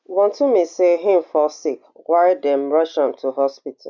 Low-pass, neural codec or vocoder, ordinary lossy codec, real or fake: 7.2 kHz; none; none; real